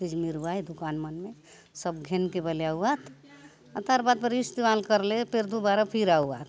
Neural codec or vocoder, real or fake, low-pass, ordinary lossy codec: none; real; none; none